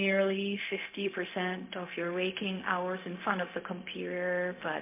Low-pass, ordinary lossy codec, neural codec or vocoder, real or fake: 3.6 kHz; MP3, 32 kbps; codec, 16 kHz, 0.4 kbps, LongCat-Audio-Codec; fake